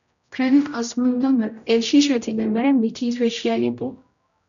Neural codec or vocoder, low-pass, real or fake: codec, 16 kHz, 0.5 kbps, X-Codec, HuBERT features, trained on general audio; 7.2 kHz; fake